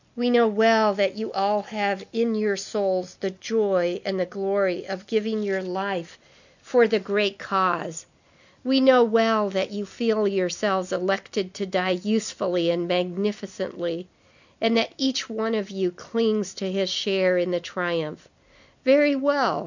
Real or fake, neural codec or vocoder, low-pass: real; none; 7.2 kHz